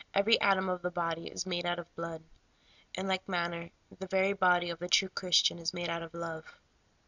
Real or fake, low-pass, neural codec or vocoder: real; 7.2 kHz; none